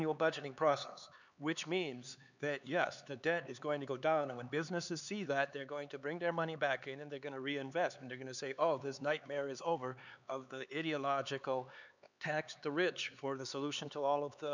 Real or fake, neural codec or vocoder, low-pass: fake; codec, 16 kHz, 4 kbps, X-Codec, HuBERT features, trained on LibriSpeech; 7.2 kHz